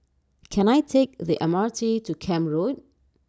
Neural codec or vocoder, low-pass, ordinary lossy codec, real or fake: none; none; none; real